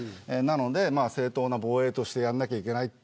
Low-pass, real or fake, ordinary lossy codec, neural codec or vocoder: none; real; none; none